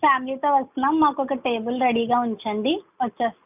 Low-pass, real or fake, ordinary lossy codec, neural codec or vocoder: 3.6 kHz; real; none; none